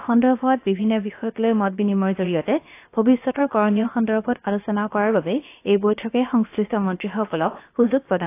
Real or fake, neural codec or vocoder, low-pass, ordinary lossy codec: fake; codec, 16 kHz, about 1 kbps, DyCAST, with the encoder's durations; 3.6 kHz; AAC, 24 kbps